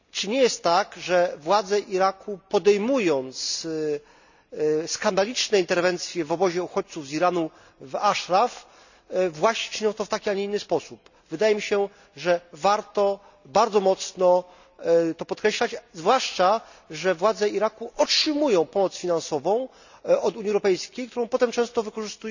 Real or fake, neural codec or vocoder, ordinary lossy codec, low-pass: real; none; none; 7.2 kHz